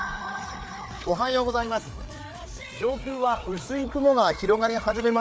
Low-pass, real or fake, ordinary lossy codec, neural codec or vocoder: none; fake; none; codec, 16 kHz, 4 kbps, FreqCodec, larger model